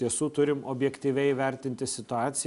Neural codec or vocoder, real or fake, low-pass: none; real; 10.8 kHz